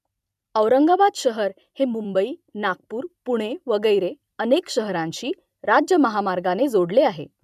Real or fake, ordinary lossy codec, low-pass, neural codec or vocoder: fake; none; 14.4 kHz; vocoder, 44.1 kHz, 128 mel bands every 256 samples, BigVGAN v2